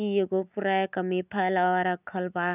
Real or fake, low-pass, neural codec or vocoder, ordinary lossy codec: real; 3.6 kHz; none; none